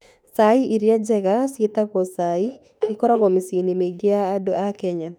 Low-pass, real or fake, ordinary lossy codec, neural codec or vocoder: 19.8 kHz; fake; none; autoencoder, 48 kHz, 32 numbers a frame, DAC-VAE, trained on Japanese speech